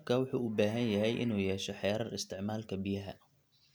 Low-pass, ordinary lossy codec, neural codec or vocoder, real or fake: none; none; none; real